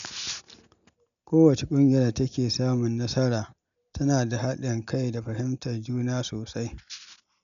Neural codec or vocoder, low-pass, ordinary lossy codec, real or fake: none; 7.2 kHz; none; real